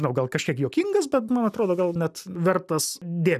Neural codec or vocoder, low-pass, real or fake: none; 14.4 kHz; real